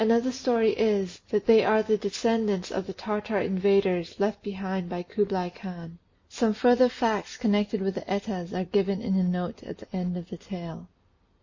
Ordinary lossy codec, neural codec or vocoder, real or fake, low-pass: MP3, 32 kbps; none; real; 7.2 kHz